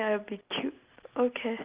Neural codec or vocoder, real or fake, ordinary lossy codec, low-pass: none; real; Opus, 32 kbps; 3.6 kHz